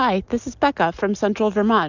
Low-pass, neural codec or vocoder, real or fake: 7.2 kHz; none; real